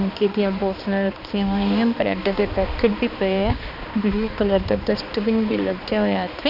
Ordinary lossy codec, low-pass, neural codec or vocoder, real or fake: none; 5.4 kHz; codec, 16 kHz, 2 kbps, X-Codec, HuBERT features, trained on balanced general audio; fake